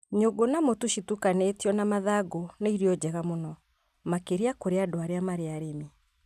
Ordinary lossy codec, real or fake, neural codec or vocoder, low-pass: none; real; none; 14.4 kHz